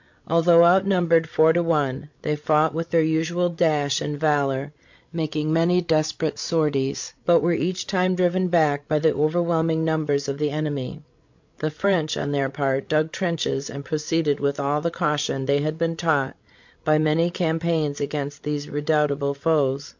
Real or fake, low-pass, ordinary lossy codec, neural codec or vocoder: fake; 7.2 kHz; MP3, 48 kbps; codec, 16 kHz, 16 kbps, FreqCodec, larger model